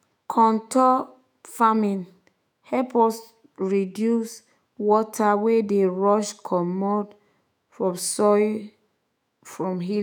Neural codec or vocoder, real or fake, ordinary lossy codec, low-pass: autoencoder, 48 kHz, 128 numbers a frame, DAC-VAE, trained on Japanese speech; fake; none; none